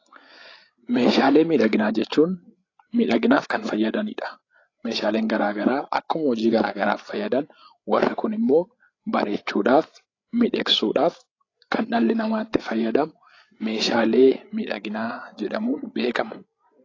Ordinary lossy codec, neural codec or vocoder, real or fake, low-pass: AAC, 32 kbps; codec, 16 kHz, 8 kbps, FreqCodec, larger model; fake; 7.2 kHz